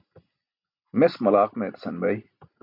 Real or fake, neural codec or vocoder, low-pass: real; none; 5.4 kHz